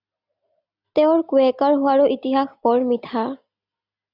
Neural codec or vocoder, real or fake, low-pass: none; real; 5.4 kHz